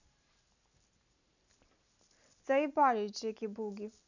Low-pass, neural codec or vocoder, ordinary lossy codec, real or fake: 7.2 kHz; none; none; real